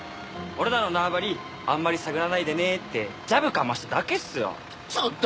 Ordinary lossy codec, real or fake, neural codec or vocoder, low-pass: none; real; none; none